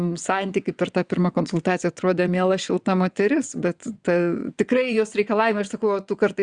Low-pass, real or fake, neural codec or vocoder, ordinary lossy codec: 9.9 kHz; fake; vocoder, 22.05 kHz, 80 mel bands, WaveNeXt; Opus, 64 kbps